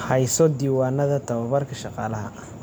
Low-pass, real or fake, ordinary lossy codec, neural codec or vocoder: none; real; none; none